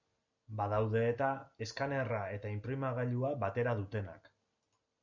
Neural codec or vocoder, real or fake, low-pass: none; real; 7.2 kHz